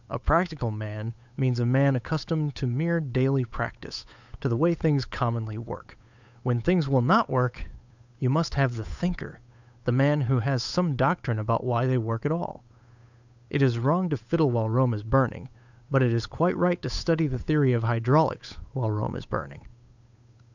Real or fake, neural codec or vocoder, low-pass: fake; codec, 16 kHz, 8 kbps, FunCodec, trained on Chinese and English, 25 frames a second; 7.2 kHz